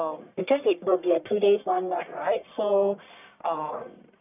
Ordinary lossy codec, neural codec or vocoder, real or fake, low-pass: none; codec, 44.1 kHz, 1.7 kbps, Pupu-Codec; fake; 3.6 kHz